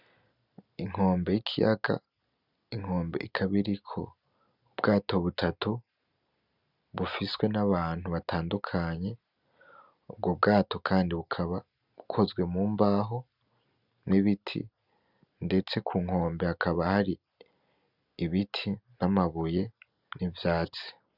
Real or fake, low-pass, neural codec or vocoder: real; 5.4 kHz; none